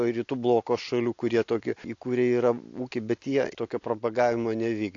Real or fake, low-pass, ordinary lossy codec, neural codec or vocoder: real; 7.2 kHz; AAC, 48 kbps; none